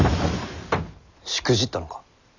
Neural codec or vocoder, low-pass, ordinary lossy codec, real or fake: none; 7.2 kHz; none; real